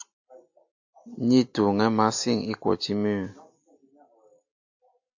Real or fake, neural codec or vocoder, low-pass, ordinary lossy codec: real; none; 7.2 kHz; AAC, 48 kbps